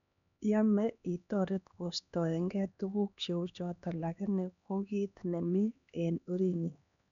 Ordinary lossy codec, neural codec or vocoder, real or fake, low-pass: none; codec, 16 kHz, 2 kbps, X-Codec, HuBERT features, trained on LibriSpeech; fake; 7.2 kHz